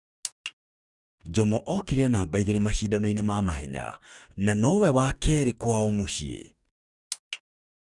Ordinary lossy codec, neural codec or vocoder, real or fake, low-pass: none; codec, 44.1 kHz, 2.6 kbps, DAC; fake; 10.8 kHz